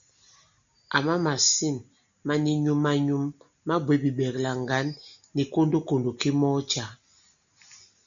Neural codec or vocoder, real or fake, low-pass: none; real; 7.2 kHz